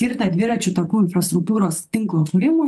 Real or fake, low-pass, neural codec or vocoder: fake; 14.4 kHz; vocoder, 44.1 kHz, 128 mel bands, Pupu-Vocoder